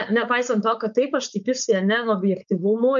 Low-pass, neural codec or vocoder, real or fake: 7.2 kHz; codec, 16 kHz, 4.8 kbps, FACodec; fake